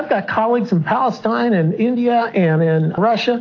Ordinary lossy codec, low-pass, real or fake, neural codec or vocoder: AAC, 48 kbps; 7.2 kHz; real; none